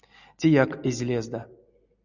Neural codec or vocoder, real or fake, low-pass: none; real; 7.2 kHz